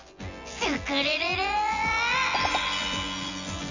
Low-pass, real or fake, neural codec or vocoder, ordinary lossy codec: 7.2 kHz; fake; vocoder, 24 kHz, 100 mel bands, Vocos; Opus, 64 kbps